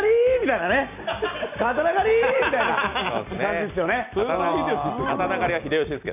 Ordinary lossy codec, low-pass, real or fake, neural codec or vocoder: none; 3.6 kHz; real; none